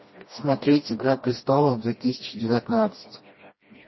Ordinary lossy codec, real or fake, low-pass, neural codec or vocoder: MP3, 24 kbps; fake; 7.2 kHz; codec, 16 kHz, 1 kbps, FreqCodec, smaller model